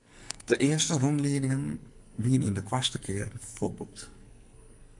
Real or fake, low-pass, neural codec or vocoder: fake; 10.8 kHz; codec, 44.1 kHz, 2.6 kbps, SNAC